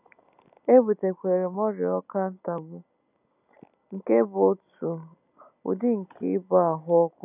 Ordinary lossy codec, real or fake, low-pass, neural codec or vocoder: none; real; 3.6 kHz; none